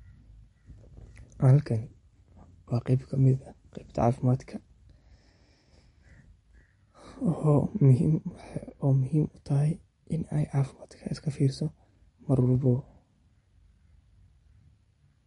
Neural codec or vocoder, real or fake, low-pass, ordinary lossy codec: none; real; 10.8 kHz; MP3, 48 kbps